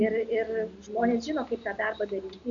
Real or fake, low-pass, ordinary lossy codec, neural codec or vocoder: real; 7.2 kHz; AAC, 48 kbps; none